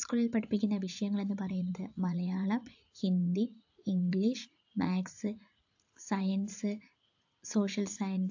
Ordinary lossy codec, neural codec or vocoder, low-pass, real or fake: AAC, 48 kbps; vocoder, 44.1 kHz, 80 mel bands, Vocos; 7.2 kHz; fake